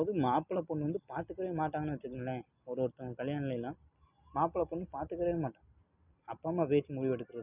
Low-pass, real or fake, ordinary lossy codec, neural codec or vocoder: 3.6 kHz; real; none; none